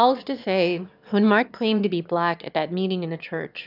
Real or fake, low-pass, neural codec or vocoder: fake; 5.4 kHz; autoencoder, 22.05 kHz, a latent of 192 numbers a frame, VITS, trained on one speaker